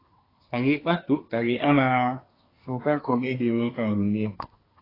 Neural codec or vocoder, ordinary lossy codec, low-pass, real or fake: codec, 24 kHz, 1 kbps, SNAC; AAC, 24 kbps; 5.4 kHz; fake